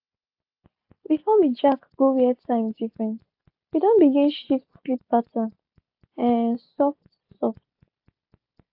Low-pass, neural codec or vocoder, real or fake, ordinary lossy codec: 5.4 kHz; none; real; AAC, 48 kbps